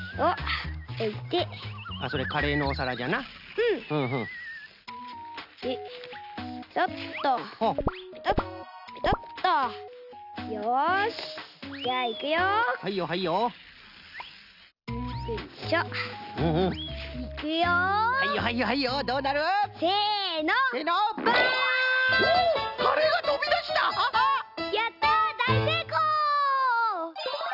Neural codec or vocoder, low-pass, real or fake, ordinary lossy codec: none; 5.4 kHz; real; none